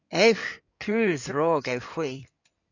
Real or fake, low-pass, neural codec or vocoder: fake; 7.2 kHz; codec, 16 kHz in and 24 kHz out, 2.2 kbps, FireRedTTS-2 codec